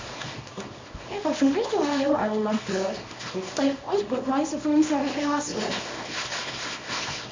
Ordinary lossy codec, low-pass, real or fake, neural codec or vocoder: MP3, 64 kbps; 7.2 kHz; fake; codec, 24 kHz, 0.9 kbps, WavTokenizer, small release